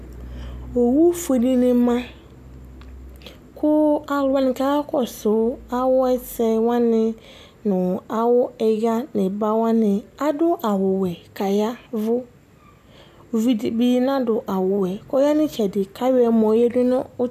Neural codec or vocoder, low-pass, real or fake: none; 14.4 kHz; real